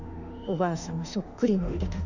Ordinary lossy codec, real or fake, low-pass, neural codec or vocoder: none; fake; 7.2 kHz; autoencoder, 48 kHz, 32 numbers a frame, DAC-VAE, trained on Japanese speech